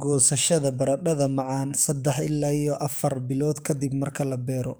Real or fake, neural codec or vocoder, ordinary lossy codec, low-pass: fake; codec, 44.1 kHz, 7.8 kbps, DAC; none; none